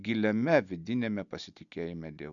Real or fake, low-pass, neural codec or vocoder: real; 7.2 kHz; none